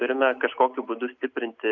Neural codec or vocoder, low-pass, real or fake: none; 7.2 kHz; real